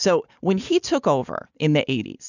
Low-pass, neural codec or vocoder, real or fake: 7.2 kHz; none; real